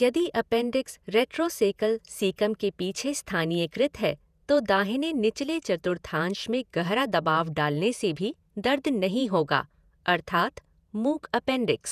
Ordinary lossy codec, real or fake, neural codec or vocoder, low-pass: none; fake; vocoder, 48 kHz, 128 mel bands, Vocos; 14.4 kHz